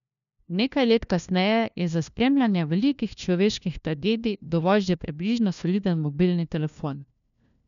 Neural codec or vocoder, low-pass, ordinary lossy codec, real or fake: codec, 16 kHz, 1 kbps, FunCodec, trained on LibriTTS, 50 frames a second; 7.2 kHz; none; fake